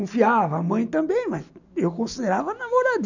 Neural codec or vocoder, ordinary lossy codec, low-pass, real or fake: none; none; 7.2 kHz; real